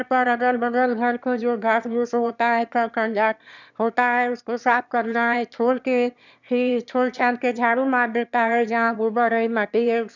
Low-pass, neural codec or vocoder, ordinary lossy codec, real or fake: 7.2 kHz; autoencoder, 22.05 kHz, a latent of 192 numbers a frame, VITS, trained on one speaker; none; fake